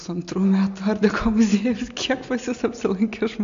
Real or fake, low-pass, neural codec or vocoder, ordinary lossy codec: real; 7.2 kHz; none; AAC, 64 kbps